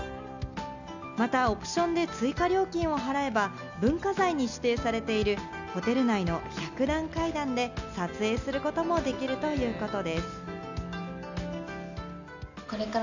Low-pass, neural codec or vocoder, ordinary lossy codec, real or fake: 7.2 kHz; none; none; real